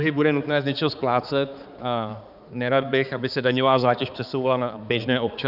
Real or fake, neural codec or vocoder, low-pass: fake; codec, 16 kHz, 4 kbps, X-Codec, HuBERT features, trained on balanced general audio; 5.4 kHz